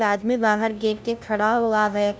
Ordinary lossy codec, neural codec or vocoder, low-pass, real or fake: none; codec, 16 kHz, 0.5 kbps, FunCodec, trained on LibriTTS, 25 frames a second; none; fake